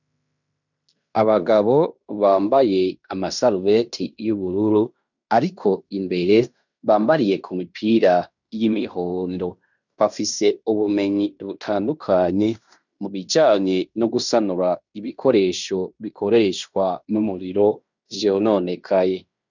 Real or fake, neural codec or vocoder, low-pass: fake; codec, 16 kHz in and 24 kHz out, 0.9 kbps, LongCat-Audio-Codec, fine tuned four codebook decoder; 7.2 kHz